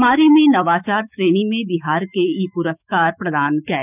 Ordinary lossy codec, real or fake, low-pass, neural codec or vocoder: none; fake; 3.6 kHz; autoencoder, 48 kHz, 128 numbers a frame, DAC-VAE, trained on Japanese speech